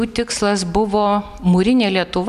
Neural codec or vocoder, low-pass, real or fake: none; 14.4 kHz; real